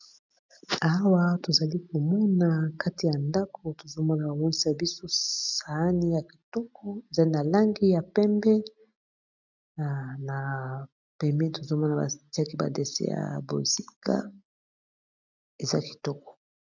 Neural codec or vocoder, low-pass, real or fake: none; 7.2 kHz; real